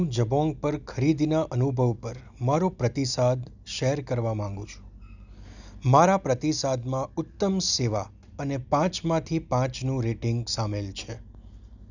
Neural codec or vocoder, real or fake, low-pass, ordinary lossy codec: none; real; 7.2 kHz; none